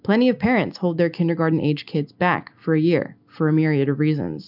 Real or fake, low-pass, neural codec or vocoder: real; 5.4 kHz; none